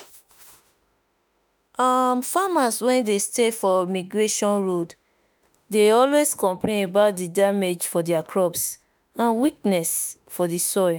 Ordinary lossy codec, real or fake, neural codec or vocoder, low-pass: none; fake; autoencoder, 48 kHz, 32 numbers a frame, DAC-VAE, trained on Japanese speech; none